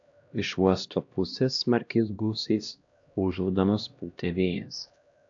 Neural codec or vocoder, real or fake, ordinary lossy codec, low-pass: codec, 16 kHz, 1 kbps, X-Codec, HuBERT features, trained on LibriSpeech; fake; AAC, 64 kbps; 7.2 kHz